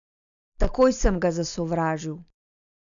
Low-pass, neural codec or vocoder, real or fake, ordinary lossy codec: 7.2 kHz; none; real; none